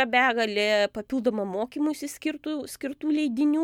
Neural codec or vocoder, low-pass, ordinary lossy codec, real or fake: none; 19.8 kHz; MP3, 96 kbps; real